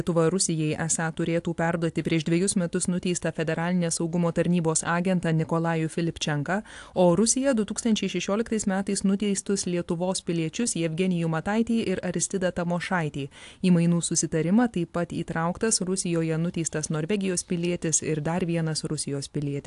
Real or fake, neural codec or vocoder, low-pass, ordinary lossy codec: real; none; 10.8 kHz; AAC, 64 kbps